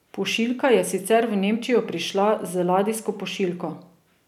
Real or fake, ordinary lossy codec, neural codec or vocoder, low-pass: real; none; none; 19.8 kHz